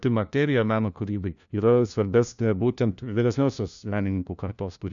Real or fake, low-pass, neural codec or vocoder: fake; 7.2 kHz; codec, 16 kHz, 1 kbps, FunCodec, trained on LibriTTS, 50 frames a second